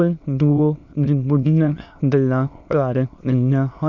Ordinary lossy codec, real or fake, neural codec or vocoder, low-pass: none; fake; autoencoder, 22.05 kHz, a latent of 192 numbers a frame, VITS, trained on many speakers; 7.2 kHz